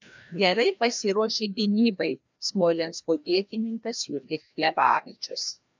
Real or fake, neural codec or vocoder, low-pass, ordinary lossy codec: fake; codec, 16 kHz, 1 kbps, FreqCodec, larger model; 7.2 kHz; MP3, 64 kbps